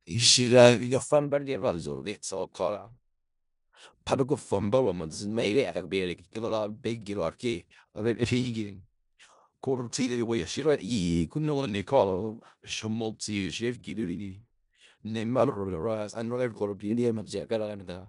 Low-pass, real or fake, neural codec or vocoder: 10.8 kHz; fake; codec, 16 kHz in and 24 kHz out, 0.4 kbps, LongCat-Audio-Codec, four codebook decoder